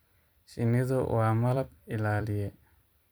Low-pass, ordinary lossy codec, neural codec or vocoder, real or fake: none; none; vocoder, 44.1 kHz, 128 mel bands every 256 samples, BigVGAN v2; fake